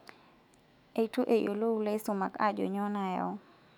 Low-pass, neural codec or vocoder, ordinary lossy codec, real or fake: 19.8 kHz; autoencoder, 48 kHz, 128 numbers a frame, DAC-VAE, trained on Japanese speech; none; fake